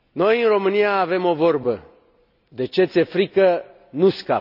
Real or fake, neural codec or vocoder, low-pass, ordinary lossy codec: real; none; 5.4 kHz; none